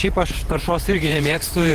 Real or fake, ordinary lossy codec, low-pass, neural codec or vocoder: fake; Opus, 16 kbps; 14.4 kHz; vocoder, 48 kHz, 128 mel bands, Vocos